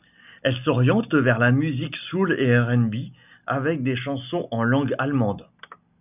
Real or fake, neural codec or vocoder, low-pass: real; none; 3.6 kHz